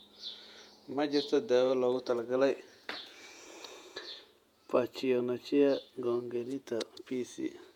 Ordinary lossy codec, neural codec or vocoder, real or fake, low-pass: MP3, 96 kbps; vocoder, 48 kHz, 128 mel bands, Vocos; fake; 19.8 kHz